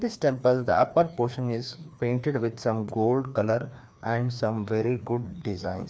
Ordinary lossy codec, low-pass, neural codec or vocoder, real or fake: none; none; codec, 16 kHz, 2 kbps, FreqCodec, larger model; fake